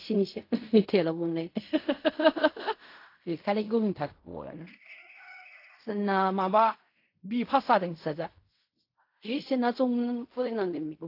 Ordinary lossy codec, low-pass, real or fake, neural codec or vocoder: AAC, 32 kbps; 5.4 kHz; fake; codec, 16 kHz in and 24 kHz out, 0.4 kbps, LongCat-Audio-Codec, fine tuned four codebook decoder